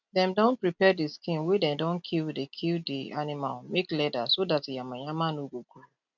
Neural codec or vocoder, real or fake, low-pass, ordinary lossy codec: none; real; 7.2 kHz; none